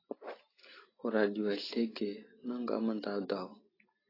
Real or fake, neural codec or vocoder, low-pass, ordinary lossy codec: real; none; 5.4 kHz; AAC, 24 kbps